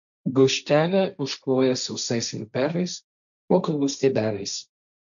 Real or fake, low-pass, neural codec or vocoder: fake; 7.2 kHz; codec, 16 kHz, 1.1 kbps, Voila-Tokenizer